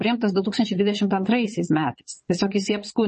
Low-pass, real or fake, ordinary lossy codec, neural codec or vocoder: 9.9 kHz; fake; MP3, 32 kbps; vocoder, 22.05 kHz, 80 mel bands, Vocos